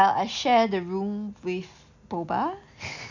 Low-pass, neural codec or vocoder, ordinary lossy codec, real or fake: 7.2 kHz; none; none; real